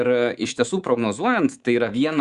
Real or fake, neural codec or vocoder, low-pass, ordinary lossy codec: fake; codec, 24 kHz, 3.1 kbps, DualCodec; 10.8 kHz; Opus, 64 kbps